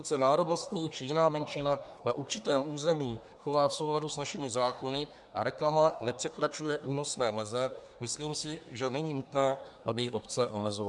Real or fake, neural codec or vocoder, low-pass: fake; codec, 24 kHz, 1 kbps, SNAC; 10.8 kHz